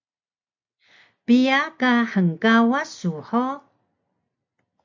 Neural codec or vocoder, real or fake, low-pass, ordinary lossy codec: none; real; 7.2 kHz; MP3, 64 kbps